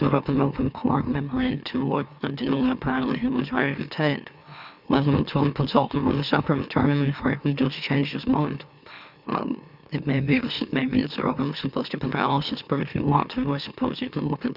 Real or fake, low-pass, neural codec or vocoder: fake; 5.4 kHz; autoencoder, 44.1 kHz, a latent of 192 numbers a frame, MeloTTS